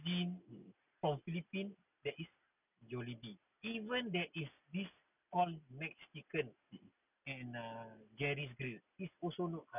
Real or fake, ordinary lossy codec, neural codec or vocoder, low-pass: real; none; none; 3.6 kHz